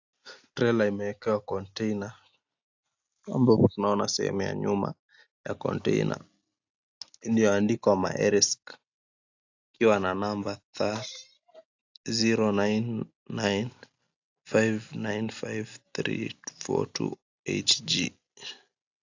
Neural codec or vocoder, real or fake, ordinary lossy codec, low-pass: none; real; AAC, 48 kbps; 7.2 kHz